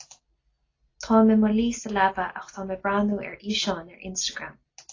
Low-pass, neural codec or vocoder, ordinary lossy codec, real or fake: 7.2 kHz; none; AAC, 32 kbps; real